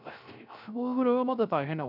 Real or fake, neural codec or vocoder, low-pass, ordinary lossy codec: fake; codec, 16 kHz, 0.3 kbps, FocalCodec; 5.4 kHz; none